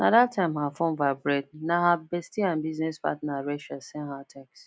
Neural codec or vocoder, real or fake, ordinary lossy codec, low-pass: none; real; none; none